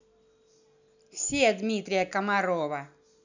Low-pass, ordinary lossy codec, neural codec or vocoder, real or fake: 7.2 kHz; none; none; real